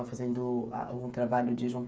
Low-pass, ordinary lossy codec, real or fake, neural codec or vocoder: none; none; fake; codec, 16 kHz, 8 kbps, FreqCodec, smaller model